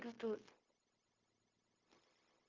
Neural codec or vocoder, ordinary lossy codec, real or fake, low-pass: codec, 16 kHz, 0.9 kbps, LongCat-Audio-Codec; Opus, 24 kbps; fake; 7.2 kHz